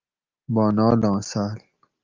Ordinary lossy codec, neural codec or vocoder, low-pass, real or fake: Opus, 24 kbps; none; 7.2 kHz; real